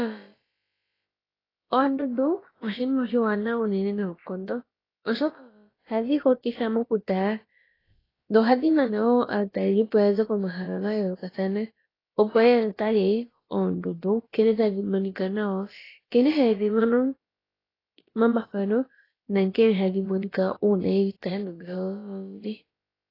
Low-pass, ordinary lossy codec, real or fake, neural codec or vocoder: 5.4 kHz; AAC, 24 kbps; fake; codec, 16 kHz, about 1 kbps, DyCAST, with the encoder's durations